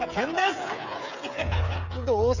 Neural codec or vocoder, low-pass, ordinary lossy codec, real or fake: codec, 16 kHz, 8 kbps, FreqCodec, smaller model; 7.2 kHz; none; fake